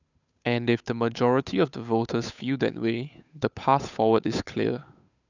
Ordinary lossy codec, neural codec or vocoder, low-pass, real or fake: none; codec, 16 kHz, 8 kbps, FunCodec, trained on Chinese and English, 25 frames a second; 7.2 kHz; fake